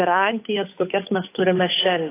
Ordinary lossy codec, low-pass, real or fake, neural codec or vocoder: AAC, 16 kbps; 3.6 kHz; fake; codec, 16 kHz, 8 kbps, FunCodec, trained on Chinese and English, 25 frames a second